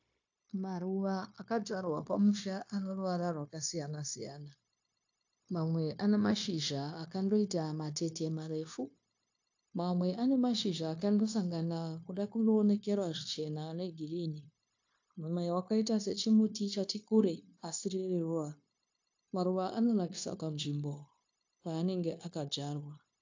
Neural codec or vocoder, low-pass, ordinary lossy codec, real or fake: codec, 16 kHz, 0.9 kbps, LongCat-Audio-Codec; 7.2 kHz; AAC, 48 kbps; fake